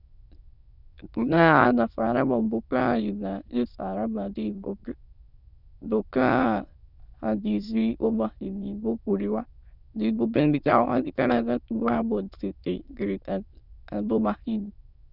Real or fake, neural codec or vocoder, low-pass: fake; autoencoder, 22.05 kHz, a latent of 192 numbers a frame, VITS, trained on many speakers; 5.4 kHz